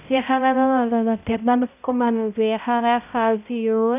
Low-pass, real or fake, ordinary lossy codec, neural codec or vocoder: 3.6 kHz; fake; none; codec, 16 kHz, 0.5 kbps, X-Codec, HuBERT features, trained on balanced general audio